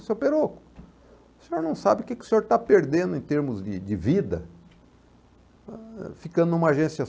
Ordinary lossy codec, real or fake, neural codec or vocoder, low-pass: none; real; none; none